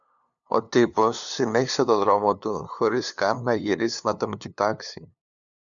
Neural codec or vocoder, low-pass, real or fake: codec, 16 kHz, 2 kbps, FunCodec, trained on LibriTTS, 25 frames a second; 7.2 kHz; fake